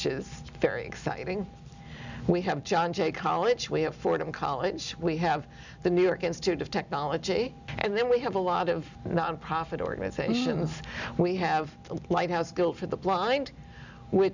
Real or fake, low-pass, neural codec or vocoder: real; 7.2 kHz; none